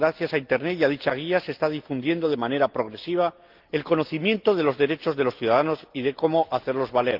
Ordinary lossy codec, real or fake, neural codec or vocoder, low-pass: Opus, 32 kbps; real; none; 5.4 kHz